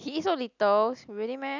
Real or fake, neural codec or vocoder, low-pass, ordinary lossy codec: real; none; 7.2 kHz; none